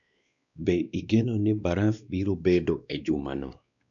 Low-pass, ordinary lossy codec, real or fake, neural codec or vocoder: 7.2 kHz; none; fake; codec, 16 kHz, 2 kbps, X-Codec, WavLM features, trained on Multilingual LibriSpeech